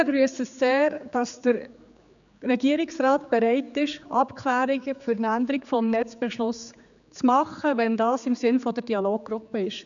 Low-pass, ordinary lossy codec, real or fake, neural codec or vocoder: 7.2 kHz; none; fake; codec, 16 kHz, 4 kbps, X-Codec, HuBERT features, trained on general audio